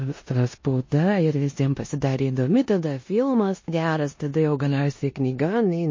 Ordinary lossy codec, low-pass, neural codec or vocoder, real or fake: MP3, 32 kbps; 7.2 kHz; codec, 16 kHz in and 24 kHz out, 0.9 kbps, LongCat-Audio-Codec, four codebook decoder; fake